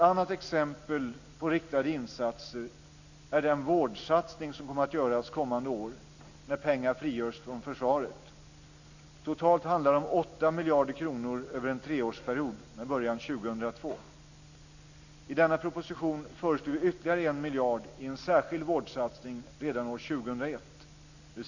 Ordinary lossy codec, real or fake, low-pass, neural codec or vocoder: none; real; 7.2 kHz; none